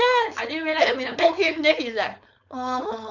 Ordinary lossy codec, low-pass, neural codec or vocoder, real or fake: none; 7.2 kHz; codec, 16 kHz, 4.8 kbps, FACodec; fake